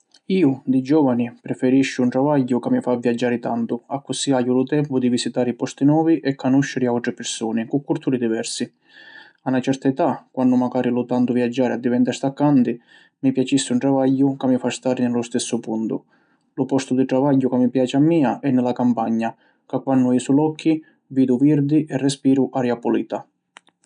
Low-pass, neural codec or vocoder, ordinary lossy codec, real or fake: 9.9 kHz; none; none; real